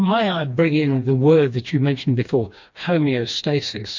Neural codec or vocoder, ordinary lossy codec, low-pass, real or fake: codec, 16 kHz, 2 kbps, FreqCodec, smaller model; MP3, 48 kbps; 7.2 kHz; fake